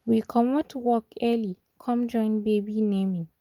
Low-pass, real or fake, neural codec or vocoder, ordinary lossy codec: 19.8 kHz; real; none; Opus, 32 kbps